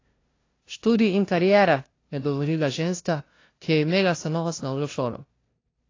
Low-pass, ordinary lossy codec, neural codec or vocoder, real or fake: 7.2 kHz; AAC, 32 kbps; codec, 16 kHz, 0.5 kbps, FunCodec, trained on LibriTTS, 25 frames a second; fake